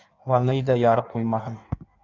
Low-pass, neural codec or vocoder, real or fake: 7.2 kHz; codec, 16 kHz in and 24 kHz out, 1.1 kbps, FireRedTTS-2 codec; fake